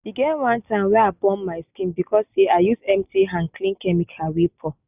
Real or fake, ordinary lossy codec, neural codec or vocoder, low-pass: real; none; none; 3.6 kHz